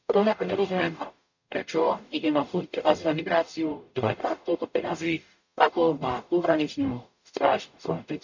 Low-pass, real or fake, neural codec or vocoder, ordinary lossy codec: 7.2 kHz; fake; codec, 44.1 kHz, 0.9 kbps, DAC; none